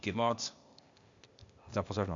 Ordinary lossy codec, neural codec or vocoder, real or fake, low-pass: MP3, 64 kbps; codec, 16 kHz, 0.8 kbps, ZipCodec; fake; 7.2 kHz